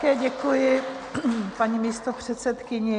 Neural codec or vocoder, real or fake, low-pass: none; real; 9.9 kHz